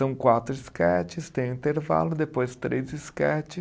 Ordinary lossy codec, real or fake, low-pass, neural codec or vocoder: none; real; none; none